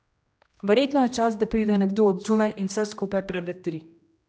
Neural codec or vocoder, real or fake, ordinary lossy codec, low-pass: codec, 16 kHz, 1 kbps, X-Codec, HuBERT features, trained on general audio; fake; none; none